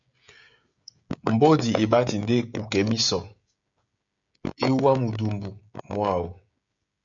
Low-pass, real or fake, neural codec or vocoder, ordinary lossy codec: 7.2 kHz; fake; codec, 16 kHz, 16 kbps, FreqCodec, smaller model; MP3, 64 kbps